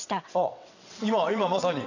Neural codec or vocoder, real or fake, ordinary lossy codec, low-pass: vocoder, 22.05 kHz, 80 mel bands, WaveNeXt; fake; none; 7.2 kHz